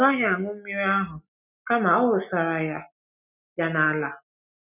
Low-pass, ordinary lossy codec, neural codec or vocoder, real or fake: 3.6 kHz; none; none; real